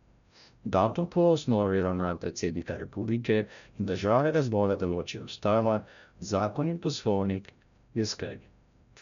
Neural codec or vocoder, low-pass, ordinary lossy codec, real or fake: codec, 16 kHz, 0.5 kbps, FreqCodec, larger model; 7.2 kHz; none; fake